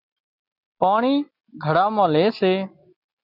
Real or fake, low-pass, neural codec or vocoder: real; 5.4 kHz; none